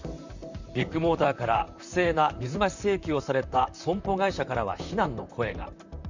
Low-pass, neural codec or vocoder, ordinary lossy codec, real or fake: 7.2 kHz; vocoder, 44.1 kHz, 128 mel bands, Pupu-Vocoder; Opus, 64 kbps; fake